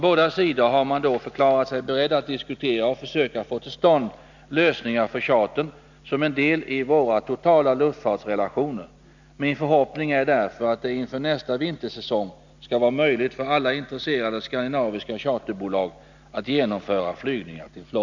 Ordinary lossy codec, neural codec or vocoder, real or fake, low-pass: none; none; real; 7.2 kHz